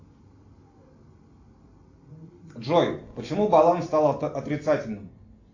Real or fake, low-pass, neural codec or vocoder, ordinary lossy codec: real; 7.2 kHz; none; AAC, 48 kbps